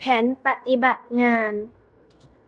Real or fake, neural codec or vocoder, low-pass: fake; codec, 16 kHz in and 24 kHz out, 0.9 kbps, LongCat-Audio-Codec, fine tuned four codebook decoder; 10.8 kHz